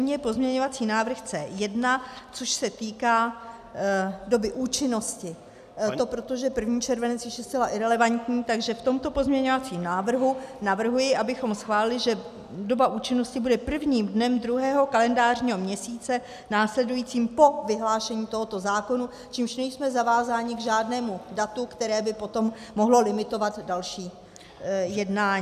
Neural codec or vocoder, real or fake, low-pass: none; real; 14.4 kHz